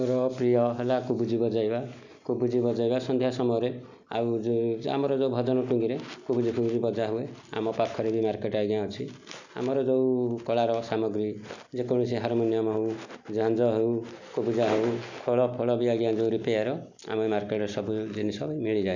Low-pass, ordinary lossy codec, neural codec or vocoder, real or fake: 7.2 kHz; none; none; real